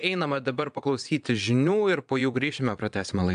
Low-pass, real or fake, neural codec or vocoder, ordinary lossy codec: 9.9 kHz; fake; vocoder, 22.05 kHz, 80 mel bands, Vocos; AAC, 96 kbps